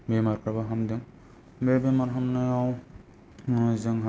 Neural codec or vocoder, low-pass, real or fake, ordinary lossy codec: none; none; real; none